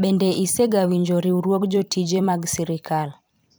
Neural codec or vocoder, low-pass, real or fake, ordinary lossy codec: vocoder, 44.1 kHz, 128 mel bands every 256 samples, BigVGAN v2; none; fake; none